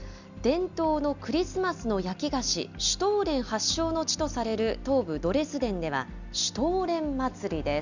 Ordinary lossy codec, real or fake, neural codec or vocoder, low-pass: none; real; none; 7.2 kHz